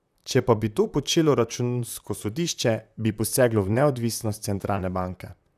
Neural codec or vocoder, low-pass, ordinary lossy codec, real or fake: vocoder, 44.1 kHz, 128 mel bands, Pupu-Vocoder; 14.4 kHz; none; fake